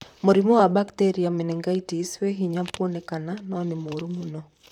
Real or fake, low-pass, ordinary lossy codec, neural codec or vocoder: fake; 19.8 kHz; none; vocoder, 44.1 kHz, 128 mel bands, Pupu-Vocoder